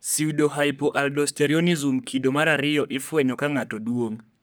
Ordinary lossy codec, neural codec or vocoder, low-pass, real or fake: none; codec, 44.1 kHz, 3.4 kbps, Pupu-Codec; none; fake